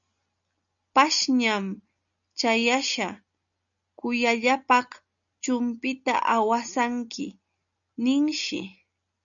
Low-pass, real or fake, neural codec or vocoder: 7.2 kHz; real; none